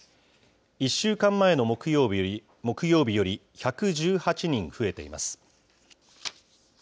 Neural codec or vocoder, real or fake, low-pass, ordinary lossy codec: none; real; none; none